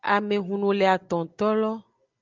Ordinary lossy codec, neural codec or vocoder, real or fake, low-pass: Opus, 24 kbps; none; real; 7.2 kHz